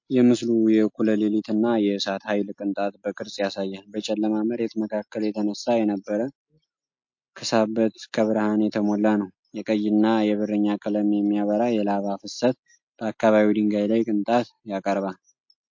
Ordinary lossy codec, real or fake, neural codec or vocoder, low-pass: MP3, 48 kbps; real; none; 7.2 kHz